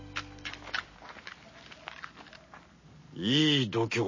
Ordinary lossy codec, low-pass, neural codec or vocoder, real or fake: MP3, 32 kbps; 7.2 kHz; none; real